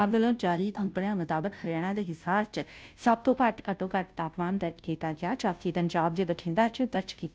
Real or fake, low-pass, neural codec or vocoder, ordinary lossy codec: fake; none; codec, 16 kHz, 0.5 kbps, FunCodec, trained on Chinese and English, 25 frames a second; none